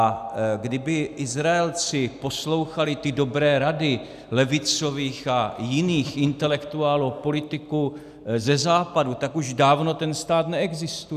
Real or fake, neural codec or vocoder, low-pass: real; none; 14.4 kHz